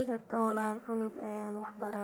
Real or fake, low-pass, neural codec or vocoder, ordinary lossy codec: fake; none; codec, 44.1 kHz, 1.7 kbps, Pupu-Codec; none